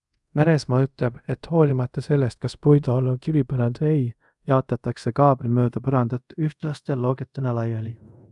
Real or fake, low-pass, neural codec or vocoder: fake; 10.8 kHz; codec, 24 kHz, 0.5 kbps, DualCodec